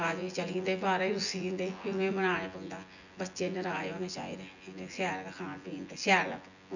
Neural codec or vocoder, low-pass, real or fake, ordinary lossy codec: vocoder, 24 kHz, 100 mel bands, Vocos; 7.2 kHz; fake; none